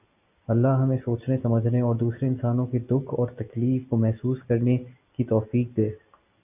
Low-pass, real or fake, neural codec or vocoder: 3.6 kHz; real; none